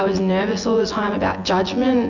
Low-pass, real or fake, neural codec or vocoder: 7.2 kHz; fake; vocoder, 24 kHz, 100 mel bands, Vocos